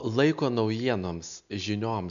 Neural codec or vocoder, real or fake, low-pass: none; real; 7.2 kHz